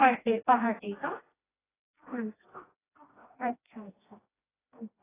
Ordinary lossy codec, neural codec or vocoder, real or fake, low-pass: AAC, 16 kbps; codec, 16 kHz, 1 kbps, FreqCodec, smaller model; fake; 3.6 kHz